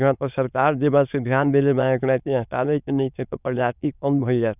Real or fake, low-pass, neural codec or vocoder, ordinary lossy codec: fake; 3.6 kHz; autoencoder, 22.05 kHz, a latent of 192 numbers a frame, VITS, trained on many speakers; none